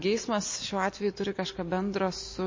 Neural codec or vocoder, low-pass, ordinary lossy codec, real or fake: none; 7.2 kHz; MP3, 32 kbps; real